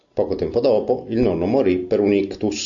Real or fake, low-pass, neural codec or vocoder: real; 7.2 kHz; none